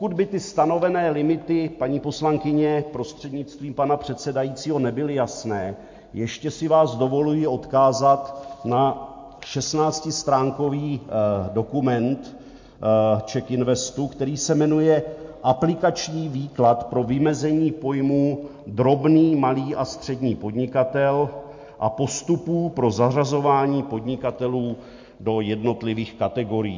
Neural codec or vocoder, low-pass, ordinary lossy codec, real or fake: none; 7.2 kHz; MP3, 48 kbps; real